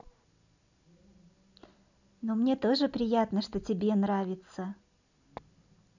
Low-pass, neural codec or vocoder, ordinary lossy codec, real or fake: 7.2 kHz; none; none; real